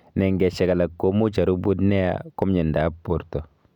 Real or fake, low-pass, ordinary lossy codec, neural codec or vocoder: real; 19.8 kHz; none; none